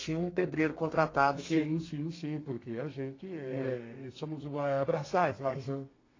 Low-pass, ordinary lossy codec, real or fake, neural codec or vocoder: 7.2 kHz; AAC, 32 kbps; fake; codec, 32 kHz, 1.9 kbps, SNAC